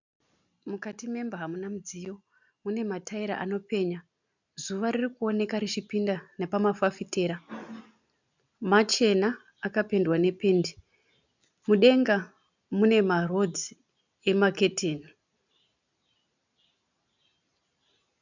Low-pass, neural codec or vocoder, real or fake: 7.2 kHz; none; real